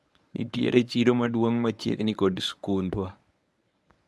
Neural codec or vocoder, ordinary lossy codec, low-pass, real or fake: codec, 24 kHz, 0.9 kbps, WavTokenizer, medium speech release version 1; none; none; fake